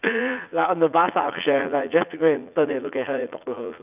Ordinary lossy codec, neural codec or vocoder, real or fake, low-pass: none; vocoder, 44.1 kHz, 80 mel bands, Vocos; fake; 3.6 kHz